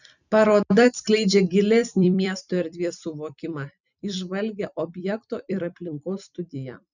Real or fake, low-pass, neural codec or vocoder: fake; 7.2 kHz; vocoder, 44.1 kHz, 128 mel bands every 256 samples, BigVGAN v2